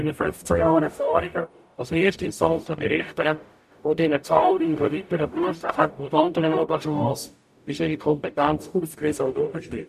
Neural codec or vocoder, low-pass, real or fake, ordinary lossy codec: codec, 44.1 kHz, 0.9 kbps, DAC; 14.4 kHz; fake; none